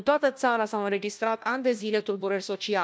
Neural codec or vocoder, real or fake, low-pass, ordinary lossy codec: codec, 16 kHz, 1 kbps, FunCodec, trained on LibriTTS, 50 frames a second; fake; none; none